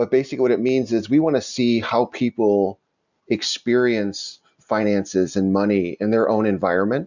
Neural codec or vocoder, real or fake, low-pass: none; real; 7.2 kHz